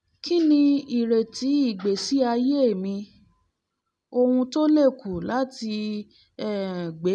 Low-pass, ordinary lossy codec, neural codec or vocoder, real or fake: none; none; none; real